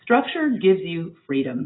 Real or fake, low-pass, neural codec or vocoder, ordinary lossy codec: real; 7.2 kHz; none; AAC, 16 kbps